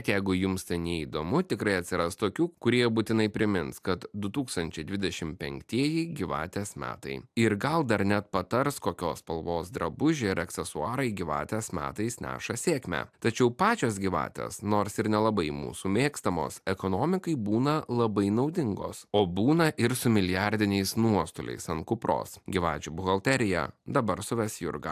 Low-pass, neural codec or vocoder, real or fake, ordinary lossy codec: 14.4 kHz; none; real; AAC, 96 kbps